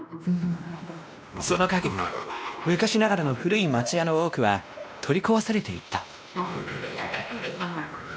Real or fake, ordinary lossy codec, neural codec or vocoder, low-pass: fake; none; codec, 16 kHz, 1 kbps, X-Codec, WavLM features, trained on Multilingual LibriSpeech; none